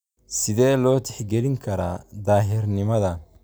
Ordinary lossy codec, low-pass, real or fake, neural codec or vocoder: none; none; real; none